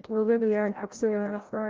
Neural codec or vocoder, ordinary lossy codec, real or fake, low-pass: codec, 16 kHz, 0.5 kbps, FreqCodec, larger model; Opus, 32 kbps; fake; 7.2 kHz